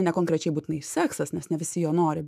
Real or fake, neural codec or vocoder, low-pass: fake; autoencoder, 48 kHz, 128 numbers a frame, DAC-VAE, trained on Japanese speech; 14.4 kHz